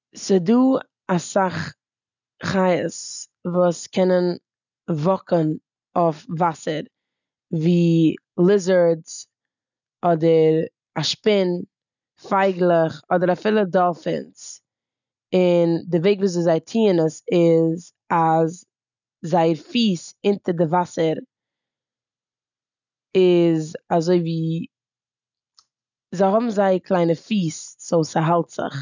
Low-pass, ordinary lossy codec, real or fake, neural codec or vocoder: 7.2 kHz; none; real; none